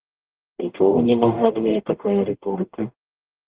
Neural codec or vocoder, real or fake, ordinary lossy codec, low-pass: codec, 44.1 kHz, 0.9 kbps, DAC; fake; Opus, 16 kbps; 3.6 kHz